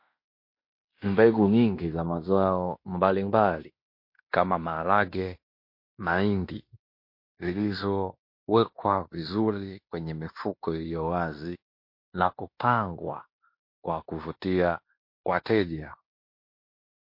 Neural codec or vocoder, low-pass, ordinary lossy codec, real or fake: codec, 16 kHz in and 24 kHz out, 0.9 kbps, LongCat-Audio-Codec, fine tuned four codebook decoder; 5.4 kHz; MP3, 32 kbps; fake